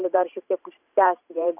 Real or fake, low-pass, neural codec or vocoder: fake; 3.6 kHz; vocoder, 44.1 kHz, 128 mel bands every 512 samples, BigVGAN v2